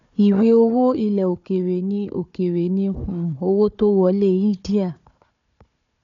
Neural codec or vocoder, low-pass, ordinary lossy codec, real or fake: codec, 16 kHz, 4 kbps, FunCodec, trained on Chinese and English, 50 frames a second; 7.2 kHz; none; fake